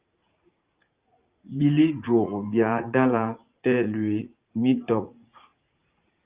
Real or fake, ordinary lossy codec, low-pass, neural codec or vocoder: fake; Opus, 32 kbps; 3.6 kHz; vocoder, 44.1 kHz, 80 mel bands, Vocos